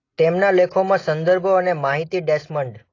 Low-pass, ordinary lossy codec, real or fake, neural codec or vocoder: 7.2 kHz; AAC, 32 kbps; real; none